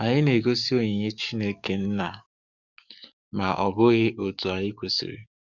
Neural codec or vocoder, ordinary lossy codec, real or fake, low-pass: codec, 44.1 kHz, 7.8 kbps, DAC; Opus, 64 kbps; fake; 7.2 kHz